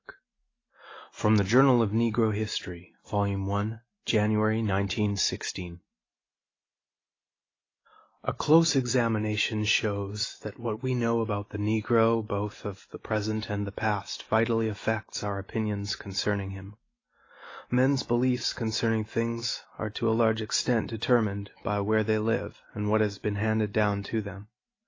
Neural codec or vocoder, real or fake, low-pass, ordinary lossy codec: none; real; 7.2 kHz; AAC, 32 kbps